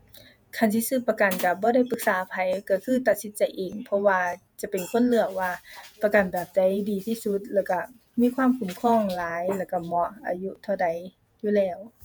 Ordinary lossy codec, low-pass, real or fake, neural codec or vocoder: none; none; fake; vocoder, 48 kHz, 128 mel bands, Vocos